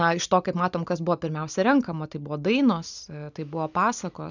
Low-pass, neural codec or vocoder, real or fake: 7.2 kHz; none; real